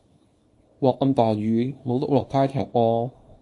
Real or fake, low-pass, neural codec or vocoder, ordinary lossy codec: fake; 10.8 kHz; codec, 24 kHz, 0.9 kbps, WavTokenizer, small release; MP3, 48 kbps